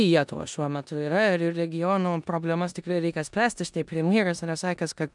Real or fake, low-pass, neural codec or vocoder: fake; 10.8 kHz; codec, 16 kHz in and 24 kHz out, 0.9 kbps, LongCat-Audio-Codec, four codebook decoder